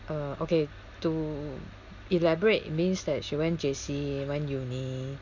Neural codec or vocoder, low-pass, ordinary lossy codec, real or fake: none; 7.2 kHz; none; real